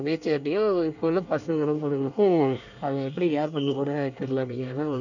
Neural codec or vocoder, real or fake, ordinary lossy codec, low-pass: codec, 24 kHz, 1 kbps, SNAC; fake; none; 7.2 kHz